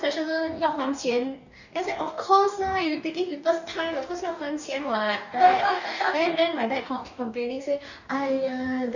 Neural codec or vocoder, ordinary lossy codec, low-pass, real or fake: codec, 44.1 kHz, 2.6 kbps, DAC; none; 7.2 kHz; fake